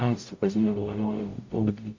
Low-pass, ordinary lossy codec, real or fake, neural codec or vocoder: 7.2 kHz; MP3, 48 kbps; fake; codec, 44.1 kHz, 0.9 kbps, DAC